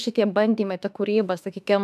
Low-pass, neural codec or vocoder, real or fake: 14.4 kHz; autoencoder, 48 kHz, 32 numbers a frame, DAC-VAE, trained on Japanese speech; fake